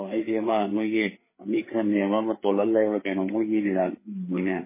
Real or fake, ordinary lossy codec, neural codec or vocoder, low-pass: fake; MP3, 16 kbps; codec, 16 kHz, 4 kbps, FreqCodec, larger model; 3.6 kHz